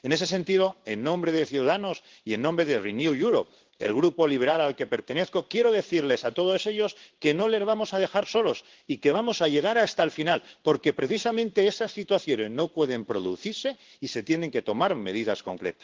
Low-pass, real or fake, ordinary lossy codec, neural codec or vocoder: 7.2 kHz; fake; Opus, 16 kbps; codec, 16 kHz in and 24 kHz out, 1 kbps, XY-Tokenizer